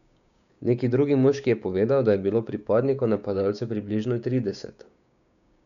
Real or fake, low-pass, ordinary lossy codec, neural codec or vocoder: fake; 7.2 kHz; none; codec, 16 kHz, 6 kbps, DAC